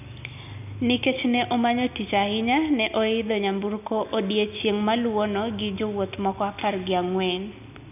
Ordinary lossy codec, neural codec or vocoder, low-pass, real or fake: none; none; 3.6 kHz; real